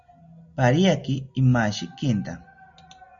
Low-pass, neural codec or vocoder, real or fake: 7.2 kHz; none; real